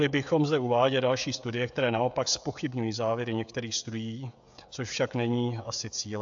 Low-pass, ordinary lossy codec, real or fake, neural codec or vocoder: 7.2 kHz; AAC, 64 kbps; fake; codec, 16 kHz, 16 kbps, FreqCodec, smaller model